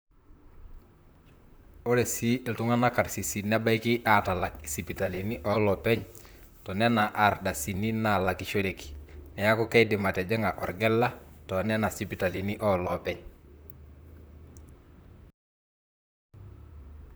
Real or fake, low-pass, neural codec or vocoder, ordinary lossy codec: fake; none; vocoder, 44.1 kHz, 128 mel bands, Pupu-Vocoder; none